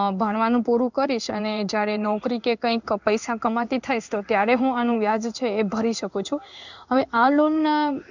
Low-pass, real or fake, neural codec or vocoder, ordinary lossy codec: 7.2 kHz; fake; codec, 16 kHz in and 24 kHz out, 1 kbps, XY-Tokenizer; none